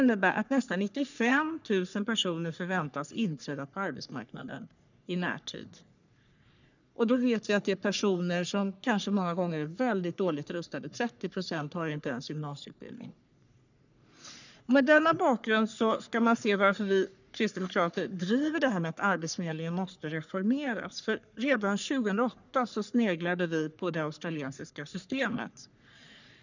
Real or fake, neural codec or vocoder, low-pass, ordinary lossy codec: fake; codec, 44.1 kHz, 3.4 kbps, Pupu-Codec; 7.2 kHz; none